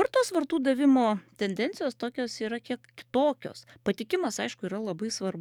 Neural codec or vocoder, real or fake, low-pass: codec, 44.1 kHz, 7.8 kbps, DAC; fake; 19.8 kHz